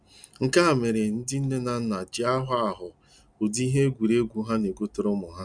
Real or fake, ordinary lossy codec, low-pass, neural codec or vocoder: real; none; 9.9 kHz; none